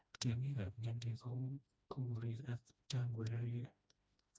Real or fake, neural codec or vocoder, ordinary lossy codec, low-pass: fake; codec, 16 kHz, 1 kbps, FreqCodec, smaller model; none; none